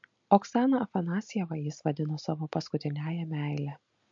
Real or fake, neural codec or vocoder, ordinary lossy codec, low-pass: real; none; MP3, 48 kbps; 7.2 kHz